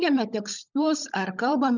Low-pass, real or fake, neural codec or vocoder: 7.2 kHz; fake; codec, 16 kHz, 16 kbps, FunCodec, trained on LibriTTS, 50 frames a second